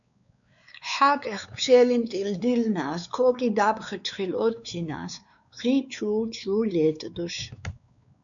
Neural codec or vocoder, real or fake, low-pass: codec, 16 kHz, 4 kbps, X-Codec, WavLM features, trained on Multilingual LibriSpeech; fake; 7.2 kHz